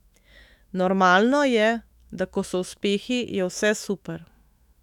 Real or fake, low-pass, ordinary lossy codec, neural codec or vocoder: fake; 19.8 kHz; none; autoencoder, 48 kHz, 128 numbers a frame, DAC-VAE, trained on Japanese speech